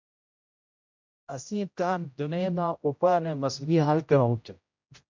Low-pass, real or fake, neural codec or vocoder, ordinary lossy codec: 7.2 kHz; fake; codec, 16 kHz, 0.5 kbps, X-Codec, HuBERT features, trained on general audio; MP3, 48 kbps